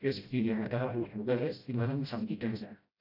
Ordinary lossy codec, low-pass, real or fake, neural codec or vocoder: AAC, 48 kbps; 5.4 kHz; fake; codec, 16 kHz, 0.5 kbps, FreqCodec, smaller model